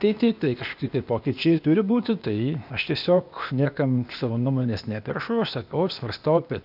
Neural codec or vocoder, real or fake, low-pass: codec, 16 kHz, 0.8 kbps, ZipCodec; fake; 5.4 kHz